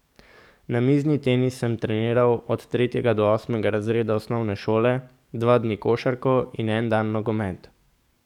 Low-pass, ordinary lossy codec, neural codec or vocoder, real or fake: 19.8 kHz; none; codec, 44.1 kHz, 7.8 kbps, DAC; fake